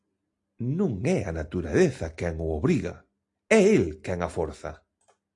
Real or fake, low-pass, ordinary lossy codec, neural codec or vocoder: real; 10.8 kHz; AAC, 48 kbps; none